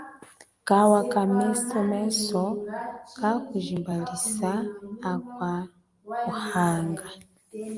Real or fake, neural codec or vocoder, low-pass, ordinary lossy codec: real; none; 10.8 kHz; Opus, 32 kbps